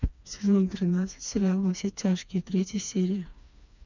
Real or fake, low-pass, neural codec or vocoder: fake; 7.2 kHz; codec, 16 kHz, 2 kbps, FreqCodec, smaller model